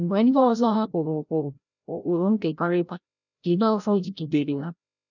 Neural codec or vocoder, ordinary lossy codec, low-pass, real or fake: codec, 16 kHz, 0.5 kbps, FreqCodec, larger model; none; 7.2 kHz; fake